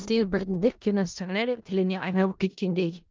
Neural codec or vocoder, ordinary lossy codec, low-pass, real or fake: codec, 16 kHz in and 24 kHz out, 0.4 kbps, LongCat-Audio-Codec, four codebook decoder; Opus, 24 kbps; 7.2 kHz; fake